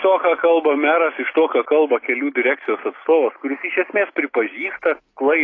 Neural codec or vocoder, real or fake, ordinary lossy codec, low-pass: none; real; AAC, 32 kbps; 7.2 kHz